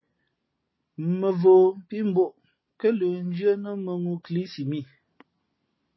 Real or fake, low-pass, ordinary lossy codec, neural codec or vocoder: real; 7.2 kHz; MP3, 24 kbps; none